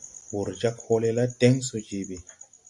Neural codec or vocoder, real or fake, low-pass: none; real; 10.8 kHz